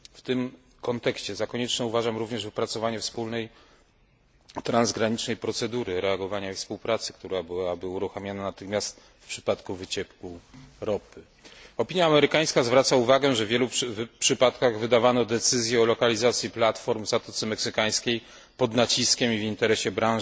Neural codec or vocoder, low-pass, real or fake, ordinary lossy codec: none; none; real; none